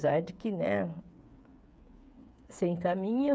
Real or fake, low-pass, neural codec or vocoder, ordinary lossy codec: fake; none; codec, 16 kHz, 8 kbps, FreqCodec, smaller model; none